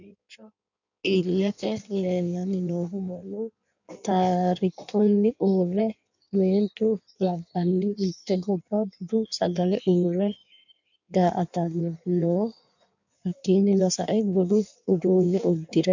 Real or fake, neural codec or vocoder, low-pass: fake; codec, 16 kHz in and 24 kHz out, 1.1 kbps, FireRedTTS-2 codec; 7.2 kHz